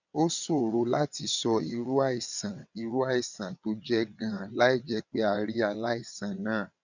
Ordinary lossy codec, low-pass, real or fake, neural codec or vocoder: none; 7.2 kHz; fake; vocoder, 22.05 kHz, 80 mel bands, WaveNeXt